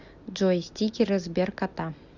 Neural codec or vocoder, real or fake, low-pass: none; real; 7.2 kHz